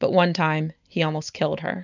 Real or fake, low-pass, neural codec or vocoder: real; 7.2 kHz; none